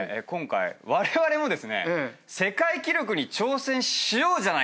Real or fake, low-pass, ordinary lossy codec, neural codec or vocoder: real; none; none; none